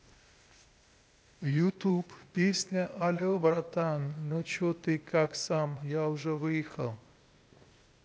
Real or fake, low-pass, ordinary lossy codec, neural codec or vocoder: fake; none; none; codec, 16 kHz, 0.8 kbps, ZipCodec